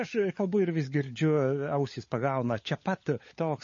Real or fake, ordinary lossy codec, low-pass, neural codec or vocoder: fake; MP3, 32 kbps; 7.2 kHz; codec, 16 kHz, 16 kbps, FunCodec, trained on LibriTTS, 50 frames a second